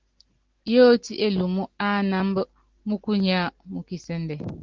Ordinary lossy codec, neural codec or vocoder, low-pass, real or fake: Opus, 16 kbps; none; 7.2 kHz; real